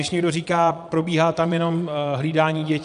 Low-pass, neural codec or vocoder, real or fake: 9.9 kHz; vocoder, 22.05 kHz, 80 mel bands, Vocos; fake